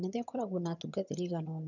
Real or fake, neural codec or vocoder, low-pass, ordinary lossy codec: fake; vocoder, 22.05 kHz, 80 mel bands, HiFi-GAN; 7.2 kHz; none